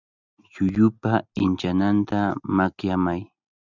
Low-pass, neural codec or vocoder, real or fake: 7.2 kHz; none; real